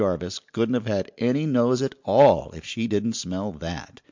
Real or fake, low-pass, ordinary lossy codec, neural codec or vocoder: real; 7.2 kHz; MP3, 64 kbps; none